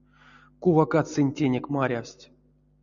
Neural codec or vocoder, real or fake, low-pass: none; real; 7.2 kHz